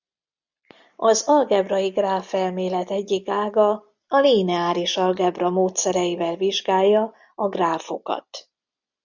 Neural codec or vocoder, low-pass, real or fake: none; 7.2 kHz; real